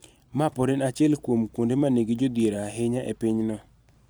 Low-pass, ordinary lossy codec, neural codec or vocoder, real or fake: none; none; vocoder, 44.1 kHz, 128 mel bands every 256 samples, BigVGAN v2; fake